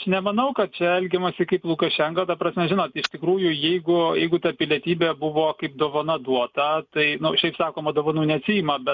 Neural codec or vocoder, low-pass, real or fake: none; 7.2 kHz; real